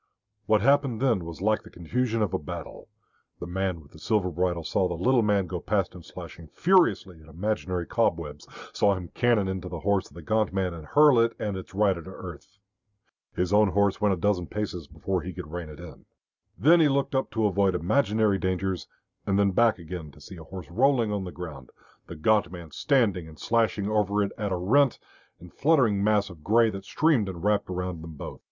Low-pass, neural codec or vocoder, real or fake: 7.2 kHz; none; real